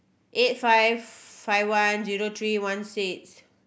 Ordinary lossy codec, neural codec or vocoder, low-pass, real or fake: none; none; none; real